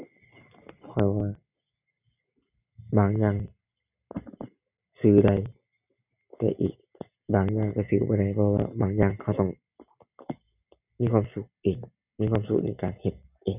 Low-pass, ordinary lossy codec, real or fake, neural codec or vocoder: 3.6 kHz; none; real; none